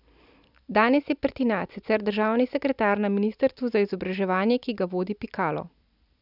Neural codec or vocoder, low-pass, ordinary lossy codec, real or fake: none; 5.4 kHz; none; real